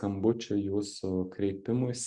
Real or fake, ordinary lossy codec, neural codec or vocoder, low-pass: real; Opus, 64 kbps; none; 10.8 kHz